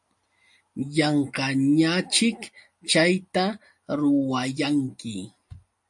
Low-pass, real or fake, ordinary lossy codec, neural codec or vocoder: 10.8 kHz; real; MP3, 48 kbps; none